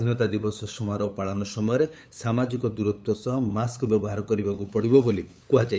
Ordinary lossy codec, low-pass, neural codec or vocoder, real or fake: none; none; codec, 16 kHz, 8 kbps, FunCodec, trained on LibriTTS, 25 frames a second; fake